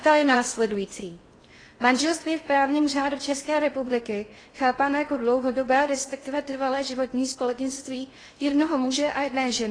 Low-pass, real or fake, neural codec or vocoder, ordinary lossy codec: 9.9 kHz; fake; codec, 16 kHz in and 24 kHz out, 0.6 kbps, FocalCodec, streaming, 2048 codes; AAC, 32 kbps